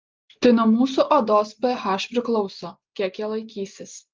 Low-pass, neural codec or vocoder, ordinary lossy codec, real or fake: 7.2 kHz; none; Opus, 32 kbps; real